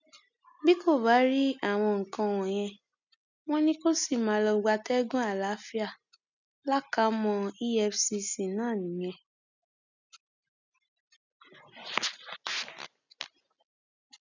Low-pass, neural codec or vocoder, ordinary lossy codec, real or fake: 7.2 kHz; none; none; real